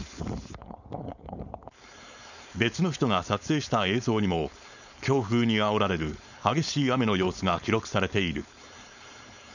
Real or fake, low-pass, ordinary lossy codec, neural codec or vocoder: fake; 7.2 kHz; none; codec, 16 kHz, 4.8 kbps, FACodec